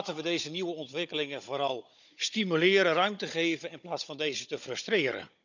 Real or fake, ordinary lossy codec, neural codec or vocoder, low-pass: fake; none; codec, 16 kHz, 16 kbps, FunCodec, trained on Chinese and English, 50 frames a second; 7.2 kHz